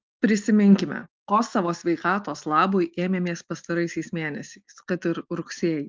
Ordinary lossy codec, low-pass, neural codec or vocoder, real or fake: Opus, 32 kbps; 7.2 kHz; none; real